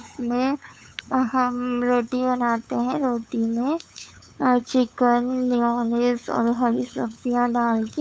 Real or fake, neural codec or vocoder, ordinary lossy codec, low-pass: fake; codec, 16 kHz, 8 kbps, FunCodec, trained on LibriTTS, 25 frames a second; none; none